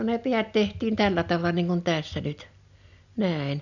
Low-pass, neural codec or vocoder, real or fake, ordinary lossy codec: 7.2 kHz; none; real; none